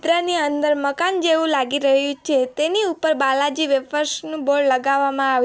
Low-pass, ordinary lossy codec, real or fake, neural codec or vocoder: none; none; real; none